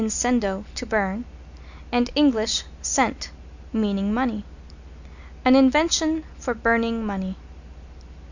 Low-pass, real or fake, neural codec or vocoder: 7.2 kHz; real; none